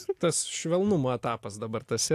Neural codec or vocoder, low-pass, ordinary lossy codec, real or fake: none; 14.4 kHz; AAC, 64 kbps; real